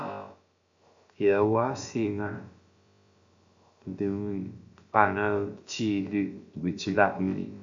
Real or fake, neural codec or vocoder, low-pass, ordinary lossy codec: fake; codec, 16 kHz, about 1 kbps, DyCAST, with the encoder's durations; 7.2 kHz; MP3, 64 kbps